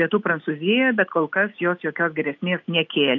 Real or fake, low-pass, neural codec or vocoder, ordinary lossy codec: real; 7.2 kHz; none; AAC, 48 kbps